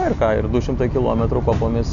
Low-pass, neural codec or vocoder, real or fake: 7.2 kHz; none; real